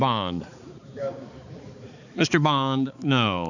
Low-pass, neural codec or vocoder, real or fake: 7.2 kHz; codec, 16 kHz, 4 kbps, X-Codec, HuBERT features, trained on balanced general audio; fake